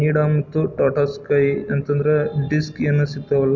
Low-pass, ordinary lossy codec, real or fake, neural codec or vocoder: 7.2 kHz; none; real; none